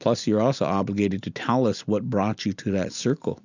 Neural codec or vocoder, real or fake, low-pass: none; real; 7.2 kHz